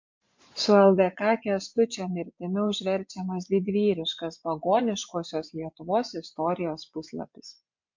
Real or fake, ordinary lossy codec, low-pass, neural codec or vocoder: fake; MP3, 48 kbps; 7.2 kHz; vocoder, 24 kHz, 100 mel bands, Vocos